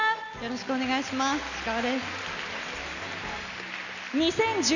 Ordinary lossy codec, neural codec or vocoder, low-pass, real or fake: none; none; 7.2 kHz; real